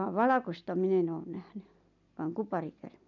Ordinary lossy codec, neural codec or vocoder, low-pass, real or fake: none; none; 7.2 kHz; real